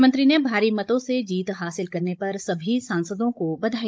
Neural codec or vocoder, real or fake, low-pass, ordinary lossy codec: codec, 16 kHz, 16 kbps, FunCodec, trained on Chinese and English, 50 frames a second; fake; none; none